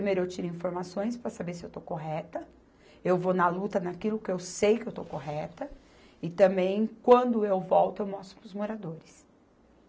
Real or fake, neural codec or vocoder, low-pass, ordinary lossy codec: real; none; none; none